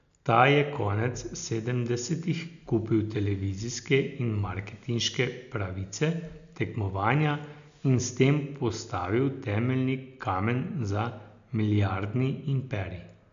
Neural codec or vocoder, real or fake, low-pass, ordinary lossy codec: none; real; 7.2 kHz; none